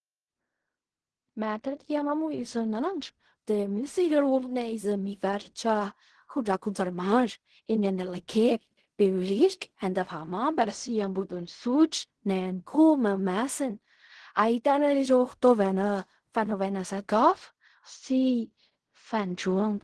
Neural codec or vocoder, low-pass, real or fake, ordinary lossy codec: codec, 16 kHz in and 24 kHz out, 0.4 kbps, LongCat-Audio-Codec, fine tuned four codebook decoder; 10.8 kHz; fake; Opus, 16 kbps